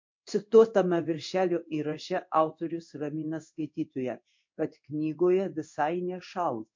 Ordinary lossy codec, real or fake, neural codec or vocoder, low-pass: MP3, 48 kbps; fake; codec, 16 kHz in and 24 kHz out, 1 kbps, XY-Tokenizer; 7.2 kHz